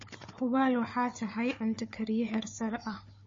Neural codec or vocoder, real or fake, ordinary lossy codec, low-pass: codec, 16 kHz, 4 kbps, FunCodec, trained on Chinese and English, 50 frames a second; fake; MP3, 32 kbps; 7.2 kHz